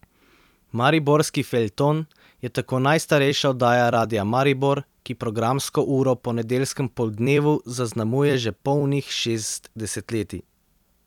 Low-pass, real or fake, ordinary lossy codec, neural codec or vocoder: 19.8 kHz; fake; none; vocoder, 44.1 kHz, 128 mel bands every 256 samples, BigVGAN v2